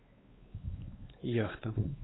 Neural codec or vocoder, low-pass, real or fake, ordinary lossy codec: codec, 16 kHz, 4 kbps, X-Codec, WavLM features, trained on Multilingual LibriSpeech; 7.2 kHz; fake; AAC, 16 kbps